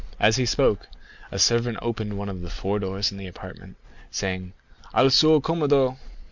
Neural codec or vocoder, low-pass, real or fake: none; 7.2 kHz; real